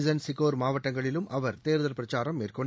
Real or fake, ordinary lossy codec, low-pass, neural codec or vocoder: real; none; none; none